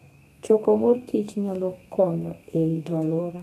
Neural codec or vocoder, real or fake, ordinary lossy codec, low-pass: codec, 32 kHz, 1.9 kbps, SNAC; fake; none; 14.4 kHz